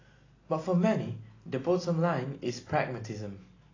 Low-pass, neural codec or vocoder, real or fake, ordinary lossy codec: 7.2 kHz; none; real; AAC, 32 kbps